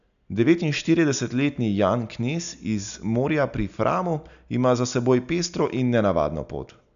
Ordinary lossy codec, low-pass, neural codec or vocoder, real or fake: none; 7.2 kHz; none; real